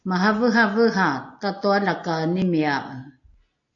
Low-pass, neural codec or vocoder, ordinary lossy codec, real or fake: 7.2 kHz; none; AAC, 64 kbps; real